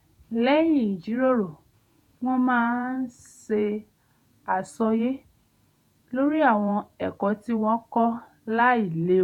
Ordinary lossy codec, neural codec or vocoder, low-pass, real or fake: none; vocoder, 48 kHz, 128 mel bands, Vocos; 19.8 kHz; fake